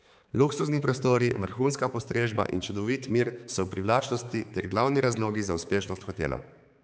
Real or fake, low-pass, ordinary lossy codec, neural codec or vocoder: fake; none; none; codec, 16 kHz, 4 kbps, X-Codec, HuBERT features, trained on balanced general audio